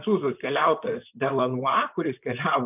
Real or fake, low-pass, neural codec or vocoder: fake; 3.6 kHz; vocoder, 44.1 kHz, 128 mel bands, Pupu-Vocoder